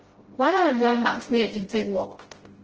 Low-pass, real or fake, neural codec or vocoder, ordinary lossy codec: 7.2 kHz; fake; codec, 16 kHz, 0.5 kbps, FreqCodec, smaller model; Opus, 16 kbps